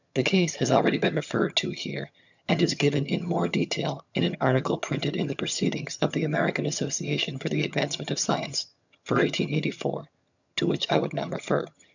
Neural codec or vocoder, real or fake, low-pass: vocoder, 22.05 kHz, 80 mel bands, HiFi-GAN; fake; 7.2 kHz